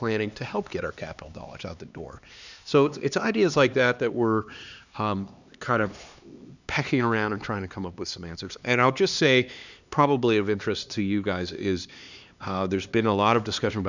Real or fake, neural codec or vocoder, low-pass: fake; codec, 16 kHz, 2 kbps, X-Codec, HuBERT features, trained on LibriSpeech; 7.2 kHz